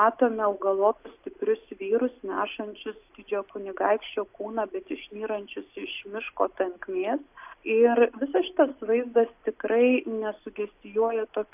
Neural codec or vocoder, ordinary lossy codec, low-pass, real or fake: none; AAC, 32 kbps; 3.6 kHz; real